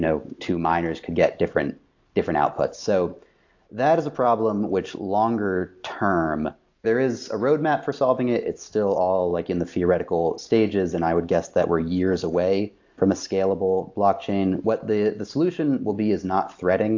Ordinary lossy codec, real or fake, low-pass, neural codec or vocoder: AAC, 48 kbps; real; 7.2 kHz; none